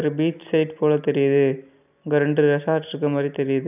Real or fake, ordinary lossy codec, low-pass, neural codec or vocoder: real; none; 3.6 kHz; none